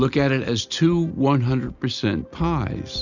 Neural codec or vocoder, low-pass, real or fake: none; 7.2 kHz; real